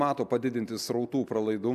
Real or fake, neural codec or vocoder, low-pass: real; none; 14.4 kHz